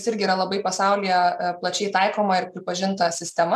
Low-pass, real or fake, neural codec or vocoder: 14.4 kHz; real; none